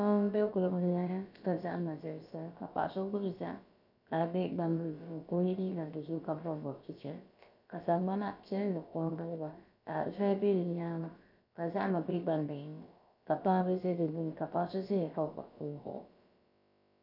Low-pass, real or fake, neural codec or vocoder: 5.4 kHz; fake; codec, 16 kHz, about 1 kbps, DyCAST, with the encoder's durations